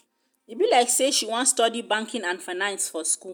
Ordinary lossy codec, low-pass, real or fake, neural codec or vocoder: none; none; real; none